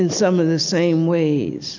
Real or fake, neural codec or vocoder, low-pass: real; none; 7.2 kHz